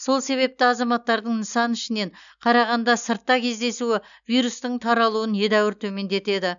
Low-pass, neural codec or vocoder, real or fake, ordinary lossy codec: 7.2 kHz; none; real; none